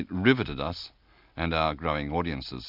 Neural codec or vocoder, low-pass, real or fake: none; 5.4 kHz; real